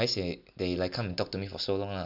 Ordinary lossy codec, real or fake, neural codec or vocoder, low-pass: none; real; none; 5.4 kHz